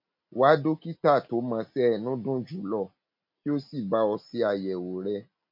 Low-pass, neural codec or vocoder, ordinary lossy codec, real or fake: 5.4 kHz; none; MP3, 48 kbps; real